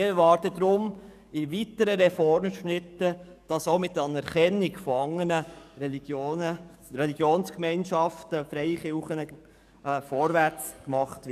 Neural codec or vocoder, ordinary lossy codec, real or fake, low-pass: codec, 44.1 kHz, 7.8 kbps, DAC; none; fake; 14.4 kHz